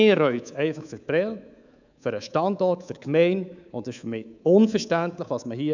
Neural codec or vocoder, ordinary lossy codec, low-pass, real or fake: codec, 24 kHz, 3.1 kbps, DualCodec; none; 7.2 kHz; fake